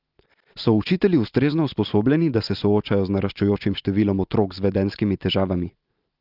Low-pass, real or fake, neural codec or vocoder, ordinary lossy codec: 5.4 kHz; real; none; Opus, 32 kbps